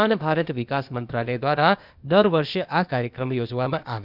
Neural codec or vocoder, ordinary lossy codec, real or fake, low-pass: codec, 16 kHz, 0.8 kbps, ZipCodec; none; fake; 5.4 kHz